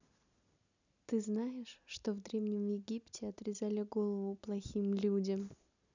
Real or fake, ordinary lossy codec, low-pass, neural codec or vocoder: real; none; 7.2 kHz; none